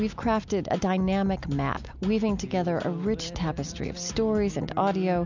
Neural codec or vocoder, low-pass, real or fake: none; 7.2 kHz; real